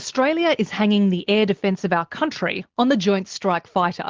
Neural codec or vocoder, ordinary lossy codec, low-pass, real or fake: none; Opus, 16 kbps; 7.2 kHz; real